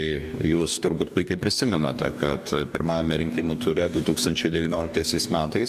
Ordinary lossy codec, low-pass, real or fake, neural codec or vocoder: AAC, 96 kbps; 14.4 kHz; fake; codec, 44.1 kHz, 2.6 kbps, DAC